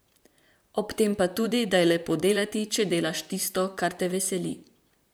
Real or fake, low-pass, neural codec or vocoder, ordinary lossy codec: fake; none; vocoder, 44.1 kHz, 128 mel bands, Pupu-Vocoder; none